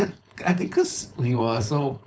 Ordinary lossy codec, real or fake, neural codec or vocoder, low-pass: none; fake; codec, 16 kHz, 4.8 kbps, FACodec; none